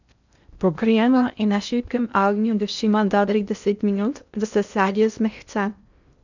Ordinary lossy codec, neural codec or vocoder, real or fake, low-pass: none; codec, 16 kHz in and 24 kHz out, 0.6 kbps, FocalCodec, streaming, 4096 codes; fake; 7.2 kHz